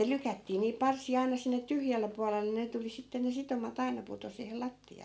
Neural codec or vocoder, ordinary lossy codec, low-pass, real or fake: none; none; none; real